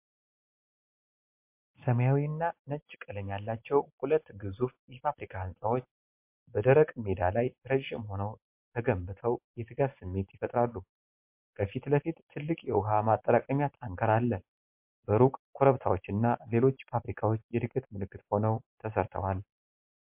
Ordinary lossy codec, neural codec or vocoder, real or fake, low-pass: MP3, 32 kbps; none; real; 3.6 kHz